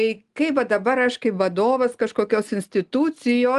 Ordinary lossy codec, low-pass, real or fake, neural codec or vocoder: Opus, 24 kbps; 10.8 kHz; real; none